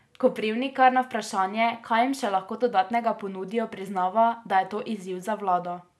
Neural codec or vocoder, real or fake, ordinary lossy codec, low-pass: none; real; none; none